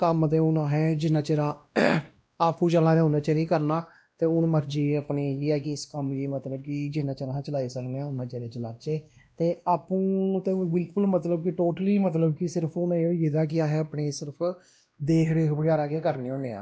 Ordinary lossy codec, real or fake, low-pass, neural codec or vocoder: none; fake; none; codec, 16 kHz, 1 kbps, X-Codec, WavLM features, trained on Multilingual LibriSpeech